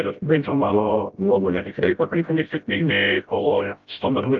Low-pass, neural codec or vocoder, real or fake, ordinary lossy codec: 7.2 kHz; codec, 16 kHz, 0.5 kbps, FreqCodec, smaller model; fake; Opus, 24 kbps